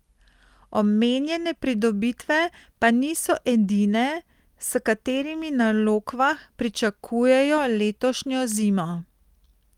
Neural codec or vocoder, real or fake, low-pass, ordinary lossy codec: none; real; 19.8 kHz; Opus, 32 kbps